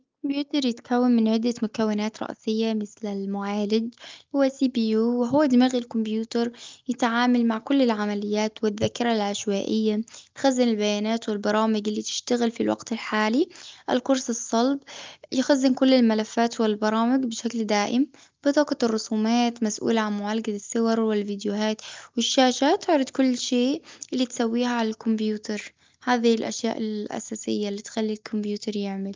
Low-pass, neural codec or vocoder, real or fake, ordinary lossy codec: 7.2 kHz; none; real; Opus, 24 kbps